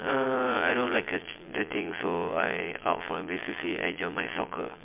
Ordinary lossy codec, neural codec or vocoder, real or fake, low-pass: MP3, 32 kbps; vocoder, 22.05 kHz, 80 mel bands, Vocos; fake; 3.6 kHz